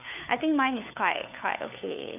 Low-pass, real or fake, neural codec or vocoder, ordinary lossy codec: 3.6 kHz; fake; codec, 16 kHz, 4 kbps, FunCodec, trained on LibriTTS, 50 frames a second; none